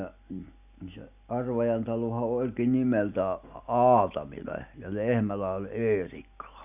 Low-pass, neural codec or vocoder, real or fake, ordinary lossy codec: 3.6 kHz; none; real; none